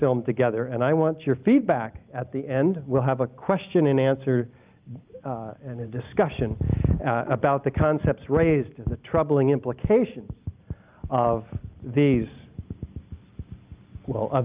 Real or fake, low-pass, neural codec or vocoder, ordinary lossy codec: real; 3.6 kHz; none; Opus, 24 kbps